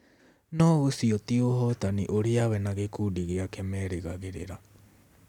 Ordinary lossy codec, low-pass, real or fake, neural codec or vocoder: none; 19.8 kHz; real; none